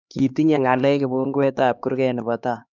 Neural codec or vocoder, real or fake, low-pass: codec, 16 kHz, 2 kbps, X-Codec, HuBERT features, trained on LibriSpeech; fake; 7.2 kHz